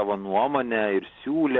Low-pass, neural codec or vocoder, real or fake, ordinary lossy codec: 7.2 kHz; none; real; Opus, 32 kbps